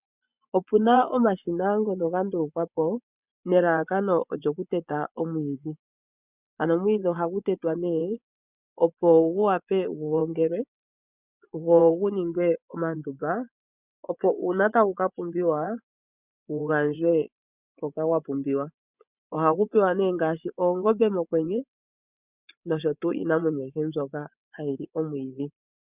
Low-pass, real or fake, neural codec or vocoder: 3.6 kHz; fake; vocoder, 24 kHz, 100 mel bands, Vocos